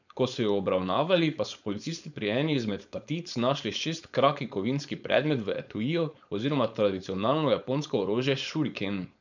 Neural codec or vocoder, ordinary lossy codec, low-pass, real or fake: codec, 16 kHz, 4.8 kbps, FACodec; none; 7.2 kHz; fake